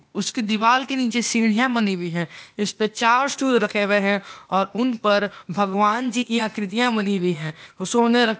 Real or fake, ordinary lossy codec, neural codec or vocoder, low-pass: fake; none; codec, 16 kHz, 0.8 kbps, ZipCodec; none